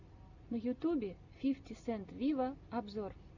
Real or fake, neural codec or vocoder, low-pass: real; none; 7.2 kHz